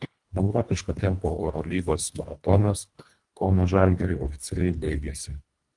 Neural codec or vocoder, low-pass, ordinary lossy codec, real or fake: codec, 24 kHz, 1.5 kbps, HILCodec; 10.8 kHz; Opus, 32 kbps; fake